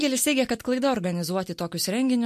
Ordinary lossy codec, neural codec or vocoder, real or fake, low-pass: MP3, 64 kbps; none; real; 14.4 kHz